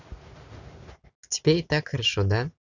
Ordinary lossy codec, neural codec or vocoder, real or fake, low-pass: none; none; real; 7.2 kHz